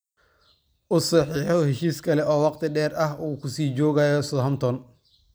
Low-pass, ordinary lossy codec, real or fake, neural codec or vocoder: none; none; fake; vocoder, 44.1 kHz, 128 mel bands every 256 samples, BigVGAN v2